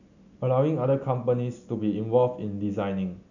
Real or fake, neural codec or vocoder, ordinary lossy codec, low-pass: real; none; none; 7.2 kHz